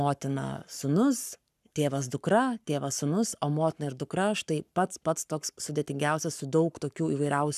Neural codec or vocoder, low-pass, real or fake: codec, 44.1 kHz, 7.8 kbps, Pupu-Codec; 14.4 kHz; fake